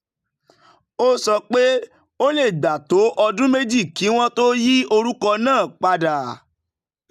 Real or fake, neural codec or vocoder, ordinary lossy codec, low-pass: real; none; none; 14.4 kHz